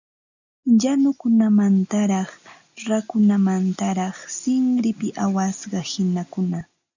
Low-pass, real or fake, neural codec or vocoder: 7.2 kHz; real; none